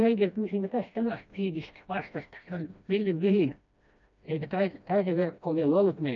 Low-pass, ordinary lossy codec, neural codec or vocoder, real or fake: 7.2 kHz; AAC, 64 kbps; codec, 16 kHz, 1 kbps, FreqCodec, smaller model; fake